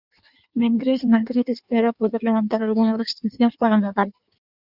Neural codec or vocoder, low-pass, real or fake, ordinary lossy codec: codec, 16 kHz in and 24 kHz out, 1.1 kbps, FireRedTTS-2 codec; 5.4 kHz; fake; Opus, 64 kbps